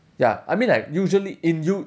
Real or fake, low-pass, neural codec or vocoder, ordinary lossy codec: real; none; none; none